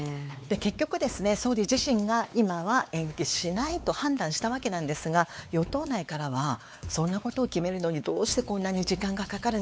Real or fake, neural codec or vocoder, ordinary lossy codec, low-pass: fake; codec, 16 kHz, 4 kbps, X-Codec, WavLM features, trained on Multilingual LibriSpeech; none; none